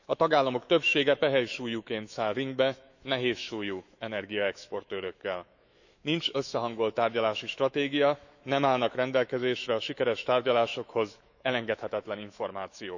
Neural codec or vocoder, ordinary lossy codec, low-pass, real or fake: autoencoder, 48 kHz, 128 numbers a frame, DAC-VAE, trained on Japanese speech; none; 7.2 kHz; fake